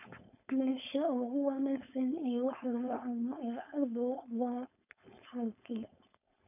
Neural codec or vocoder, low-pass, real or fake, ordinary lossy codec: codec, 16 kHz, 4.8 kbps, FACodec; 3.6 kHz; fake; none